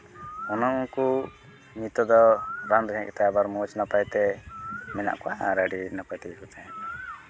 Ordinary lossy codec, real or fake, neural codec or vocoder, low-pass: none; real; none; none